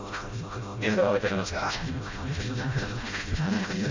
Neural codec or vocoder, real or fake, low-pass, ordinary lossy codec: codec, 16 kHz, 0.5 kbps, FreqCodec, smaller model; fake; 7.2 kHz; MP3, 48 kbps